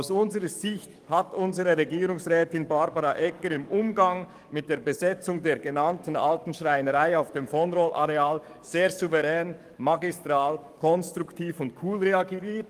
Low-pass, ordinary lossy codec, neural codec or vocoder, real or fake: 14.4 kHz; Opus, 32 kbps; codec, 44.1 kHz, 7.8 kbps, DAC; fake